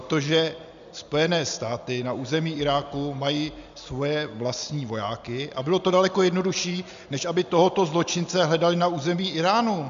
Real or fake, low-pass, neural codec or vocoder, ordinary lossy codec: real; 7.2 kHz; none; MP3, 64 kbps